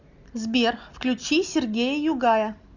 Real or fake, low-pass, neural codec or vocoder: real; 7.2 kHz; none